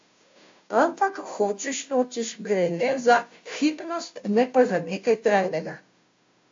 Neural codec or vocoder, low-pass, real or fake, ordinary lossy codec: codec, 16 kHz, 0.5 kbps, FunCodec, trained on Chinese and English, 25 frames a second; 7.2 kHz; fake; AAC, 48 kbps